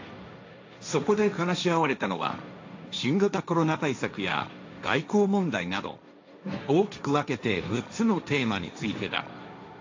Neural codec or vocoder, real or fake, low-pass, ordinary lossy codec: codec, 16 kHz, 1.1 kbps, Voila-Tokenizer; fake; 7.2 kHz; AAC, 48 kbps